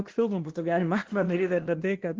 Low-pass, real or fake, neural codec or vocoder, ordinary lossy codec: 7.2 kHz; fake; codec, 16 kHz, 1 kbps, X-Codec, WavLM features, trained on Multilingual LibriSpeech; Opus, 16 kbps